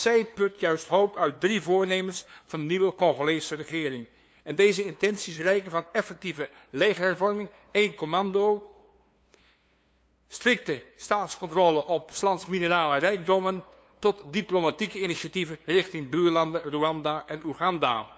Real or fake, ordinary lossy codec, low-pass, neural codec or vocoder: fake; none; none; codec, 16 kHz, 2 kbps, FunCodec, trained on LibriTTS, 25 frames a second